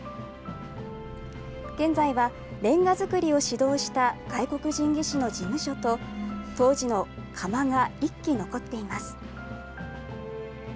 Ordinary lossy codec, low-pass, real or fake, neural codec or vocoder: none; none; real; none